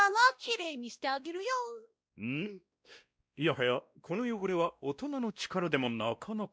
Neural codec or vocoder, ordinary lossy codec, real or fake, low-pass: codec, 16 kHz, 1 kbps, X-Codec, WavLM features, trained on Multilingual LibriSpeech; none; fake; none